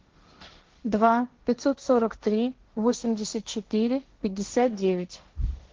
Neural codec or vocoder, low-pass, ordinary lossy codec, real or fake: codec, 16 kHz, 1.1 kbps, Voila-Tokenizer; 7.2 kHz; Opus, 16 kbps; fake